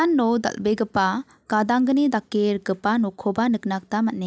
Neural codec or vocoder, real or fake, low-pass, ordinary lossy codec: none; real; none; none